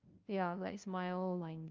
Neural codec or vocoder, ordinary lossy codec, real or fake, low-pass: codec, 16 kHz, 0.5 kbps, FunCodec, trained on LibriTTS, 25 frames a second; Opus, 24 kbps; fake; 7.2 kHz